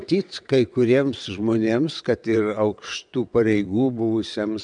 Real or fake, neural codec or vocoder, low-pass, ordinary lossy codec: fake; vocoder, 22.05 kHz, 80 mel bands, WaveNeXt; 9.9 kHz; MP3, 96 kbps